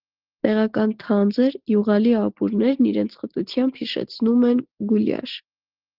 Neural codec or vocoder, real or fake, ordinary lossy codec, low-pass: none; real; Opus, 16 kbps; 5.4 kHz